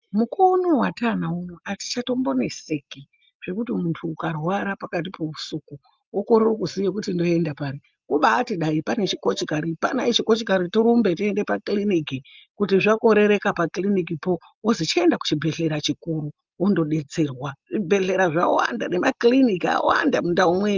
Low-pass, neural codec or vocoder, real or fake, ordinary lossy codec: 7.2 kHz; none; real; Opus, 24 kbps